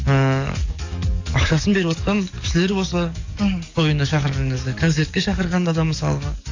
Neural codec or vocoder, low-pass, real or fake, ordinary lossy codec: codec, 44.1 kHz, 7.8 kbps, DAC; 7.2 kHz; fake; AAC, 48 kbps